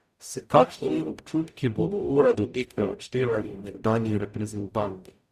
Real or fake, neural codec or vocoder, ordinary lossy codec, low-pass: fake; codec, 44.1 kHz, 0.9 kbps, DAC; Opus, 64 kbps; 14.4 kHz